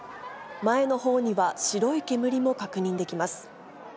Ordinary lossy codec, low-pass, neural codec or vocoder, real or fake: none; none; none; real